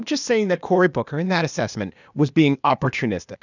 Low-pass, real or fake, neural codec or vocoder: 7.2 kHz; fake; codec, 16 kHz, 0.8 kbps, ZipCodec